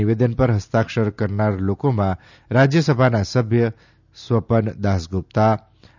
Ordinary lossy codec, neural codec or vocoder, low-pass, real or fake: none; none; 7.2 kHz; real